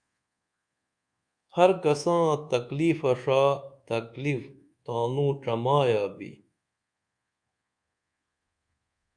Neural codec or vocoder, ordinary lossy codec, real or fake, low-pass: codec, 24 kHz, 1.2 kbps, DualCodec; Opus, 64 kbps; fake; 9.9 kHz